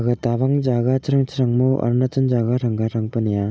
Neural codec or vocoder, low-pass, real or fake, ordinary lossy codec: none; none; real; none